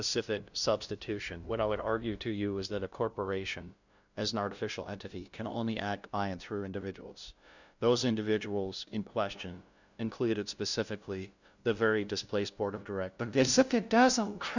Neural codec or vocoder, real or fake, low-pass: codec, 16 kHz, 0.5 kbps, FunCodec, trained on LibriTTS, 25 frames a second; fake; 7.2 kHz